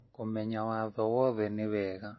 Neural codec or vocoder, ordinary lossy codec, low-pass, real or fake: none; MP3, 24 kbps; 7.2 kHz; real